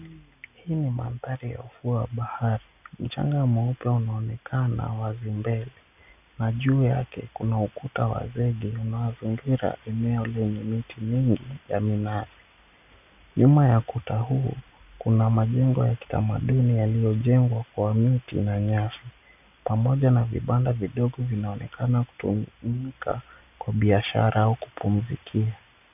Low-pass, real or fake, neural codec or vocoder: 3.6 kHz; real; none